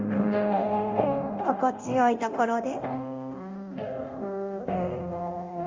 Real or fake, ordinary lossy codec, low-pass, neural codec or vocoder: fake; Opus, 32 kbps; 7.2 kHz; codec, 24 kHz, 0.9 kbps, DualCodec